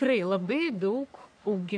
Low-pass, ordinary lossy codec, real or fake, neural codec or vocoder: 9.9 kHz; AAC, 64 kbps; fake; codec, 44.1 kHz, 3.4 kbps, Pupu-Codec